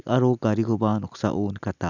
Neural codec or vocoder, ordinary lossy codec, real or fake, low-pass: none; none; real; 7.2 kHz